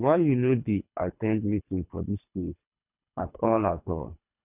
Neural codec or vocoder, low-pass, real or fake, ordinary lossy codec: codec, 24 kHz, 3 kbps, HILCodec; 3.6 kHz; fake; none